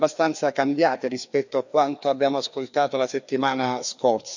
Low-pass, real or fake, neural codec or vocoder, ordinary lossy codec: 7.2 kHz; fake; codec, 16 kHz, 2 kbps, FreqCodec, larger model; none